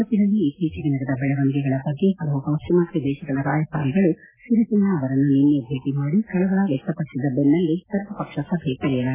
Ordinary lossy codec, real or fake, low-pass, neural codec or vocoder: AAC, 16 kbps; real; 3.6 kHz; none